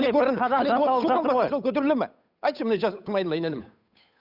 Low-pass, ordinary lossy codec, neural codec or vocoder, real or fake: 5.4 kHz; none; codec, 16 kHz, 8 kbps, FunCodec, trained on Chinese and English, 25 frames a second; fake